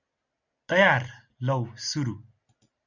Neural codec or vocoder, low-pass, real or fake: none; 7.2 kHz; real